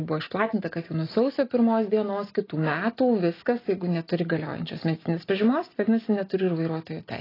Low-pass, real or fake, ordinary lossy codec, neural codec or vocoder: 5.4 kHz; real; AAC, 24 kbps; none